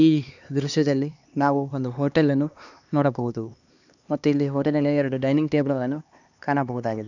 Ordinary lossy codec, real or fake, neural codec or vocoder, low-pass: none; fake; codec, 16 kHz, 2 kbps, X-Codec, HuBERT features, trained on LibriSpeech; 7.2 kHz